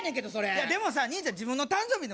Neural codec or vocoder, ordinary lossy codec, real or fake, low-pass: none; none; real; none